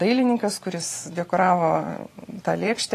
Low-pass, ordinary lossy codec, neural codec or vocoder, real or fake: 14.4 kHz; AAC, 48 kbps; vocoder, 44.1 kHz, 128 mel bands every 512 samples, BigVGAN v2; fake